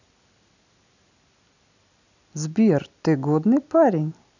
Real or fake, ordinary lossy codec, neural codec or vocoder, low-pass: real; none; none; 7.2 kHz